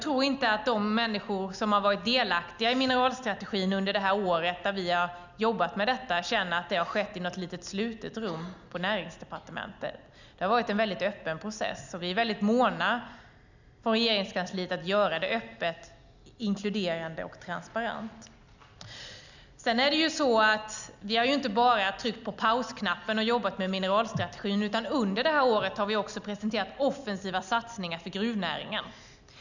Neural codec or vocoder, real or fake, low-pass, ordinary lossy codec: none; real; 7.2 kHz; none